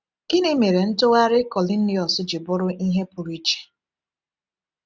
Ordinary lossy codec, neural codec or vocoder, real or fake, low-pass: none; none; real; none